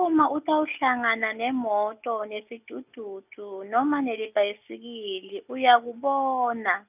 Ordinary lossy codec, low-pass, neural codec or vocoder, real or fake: none; 3.6 kHz; none; real